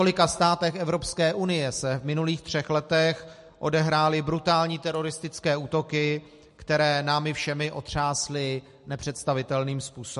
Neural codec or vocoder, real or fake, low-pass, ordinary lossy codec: none; real; 14.4 kHz; MP3, 48 kbps